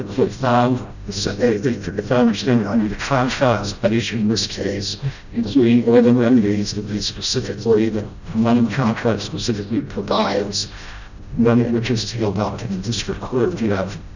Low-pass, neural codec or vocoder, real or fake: 7.2 kHz; codec, 16 kHz, 0.5 kbps, FreqCodec, smaller model; fake